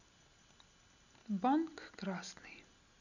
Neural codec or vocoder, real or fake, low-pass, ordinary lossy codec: none; real; 7.2 kHz; none